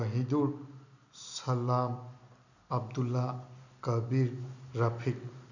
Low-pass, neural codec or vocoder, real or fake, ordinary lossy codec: 7.2 kHz; none; real; none